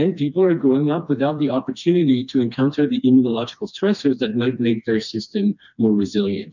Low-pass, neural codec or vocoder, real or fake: 7.2 kHz; codec, 16 kHz, 2 kbps, FreqCodec, smaller model; fake